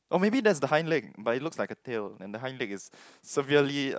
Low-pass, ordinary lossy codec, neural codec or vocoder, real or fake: none; none; none; real